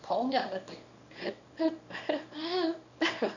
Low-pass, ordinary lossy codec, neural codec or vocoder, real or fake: 7.2 kHz; none; codec, 24 kHz, 0.9 kbps, WavTokenizer, small release; fake